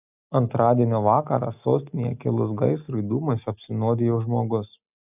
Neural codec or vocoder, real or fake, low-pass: none; real; 3.6 kHz